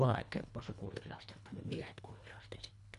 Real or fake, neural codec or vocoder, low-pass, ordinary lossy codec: fake; codec, 24 kHz, 1.5 kbps, HILCodec; 10.8 kHz; none